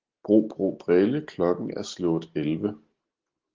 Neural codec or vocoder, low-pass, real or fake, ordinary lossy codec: none; 7.2 kHz; real; Opus, 16 kbps